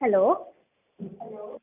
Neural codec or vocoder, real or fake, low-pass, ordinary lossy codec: none; real; 3.6 kHz; none